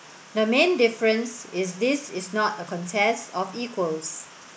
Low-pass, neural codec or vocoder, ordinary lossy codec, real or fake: none; none; none; real